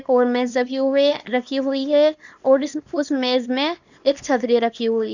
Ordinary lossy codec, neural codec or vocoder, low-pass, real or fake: none; codec, 24 kHz, 0.9 kbps, WavTokenizer, small release; 7.2 kHz; fake